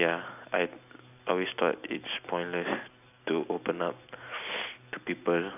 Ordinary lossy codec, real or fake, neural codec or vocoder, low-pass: none; real; none; 3.6 kHz